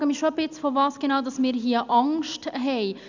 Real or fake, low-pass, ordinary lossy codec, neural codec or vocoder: real; 7.2 kHz; Opus, 64 kbps; none